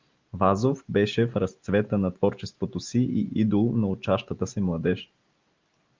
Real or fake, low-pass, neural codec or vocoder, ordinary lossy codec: real; 7.2 kHz; none; Opus, 24 kbps